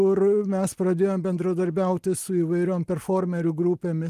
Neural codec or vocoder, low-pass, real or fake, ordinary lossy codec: none; 14.4 kHz; real; Opus, 24 kbps